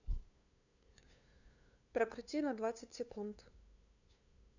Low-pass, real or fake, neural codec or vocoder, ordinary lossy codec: 7.2 kHz; fake; codec, 16 kHz, 2 kbps, FunCodec, trained on LibriTTS, 25 frames a second; none